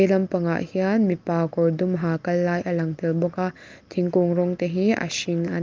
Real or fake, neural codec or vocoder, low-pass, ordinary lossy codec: real; none; 7.2 kHz; Opus, 32 kbps